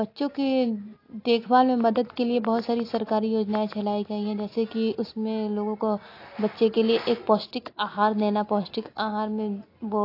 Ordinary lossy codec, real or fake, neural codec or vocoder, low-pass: AAC, 32 kbps; real; none; 5.4 kHz